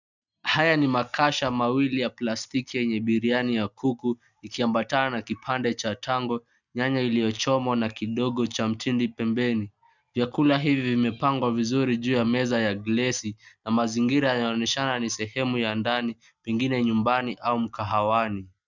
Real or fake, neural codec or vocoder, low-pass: real; none; 7.2 kHz